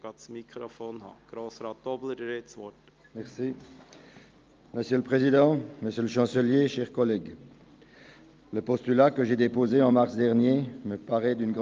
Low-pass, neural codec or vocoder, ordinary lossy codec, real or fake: 7.2 kHz; none; Opus, 32 kbps; real